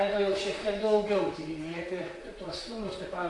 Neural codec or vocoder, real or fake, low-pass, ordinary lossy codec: vocoder, 44.1 kHz, 128 mel bands, Pupu-Vocoder; fake; 10.8 kHz; AAC, 48 kbps